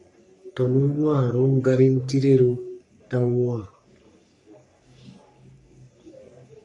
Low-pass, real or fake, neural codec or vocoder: 10.8 kHz; fake; codec, 44.1 kHz, 3.4 kbps, Pupu-Codec